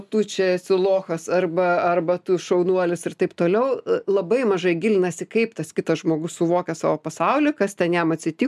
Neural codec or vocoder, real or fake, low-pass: none; real; 14.4 kHz